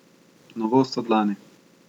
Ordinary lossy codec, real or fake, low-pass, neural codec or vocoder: none; real; 19.8 kHz; none